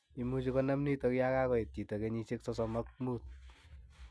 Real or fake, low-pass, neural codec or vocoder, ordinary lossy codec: real; none; none; none